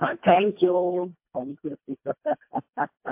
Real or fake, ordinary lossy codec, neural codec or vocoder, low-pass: fake; MP3, 32 kbps; codec, 24 kHz, 1.5 kbps, HILCodec; 3.6 kHz